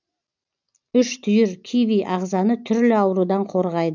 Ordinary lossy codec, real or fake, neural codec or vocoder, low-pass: none; real; none; 7.2 kHz